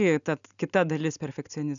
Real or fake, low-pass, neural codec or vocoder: real; 7.2 kHz; none